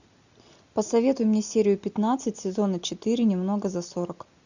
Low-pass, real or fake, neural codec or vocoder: 7.2 kHz; real; none